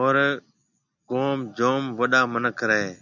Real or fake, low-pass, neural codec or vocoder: real; 7.2 kHz; none